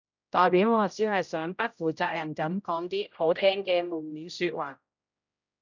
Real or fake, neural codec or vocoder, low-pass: fake; codec, 16 kHz, 0.5 kbps, X-Codec, HuBERT features, trained on general audio; 7.2 kHz